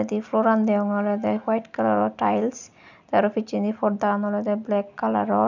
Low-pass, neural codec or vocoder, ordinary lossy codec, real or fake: 7.2 kHz; none; none; real